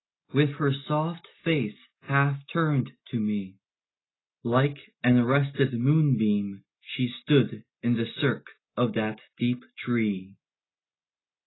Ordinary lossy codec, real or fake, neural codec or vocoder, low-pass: AAC, 16 kbps; real; none; 7.2 kHz